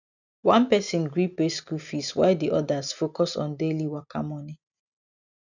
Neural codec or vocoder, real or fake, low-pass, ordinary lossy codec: none; real; 7.2 kHz; none